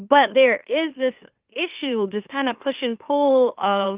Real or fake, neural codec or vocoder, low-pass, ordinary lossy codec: fake; autoencoder, 44.1 kHz, a latent of 192 numbers a frame, MeloTTS; 3.6 kHz; Opus, 24 kbps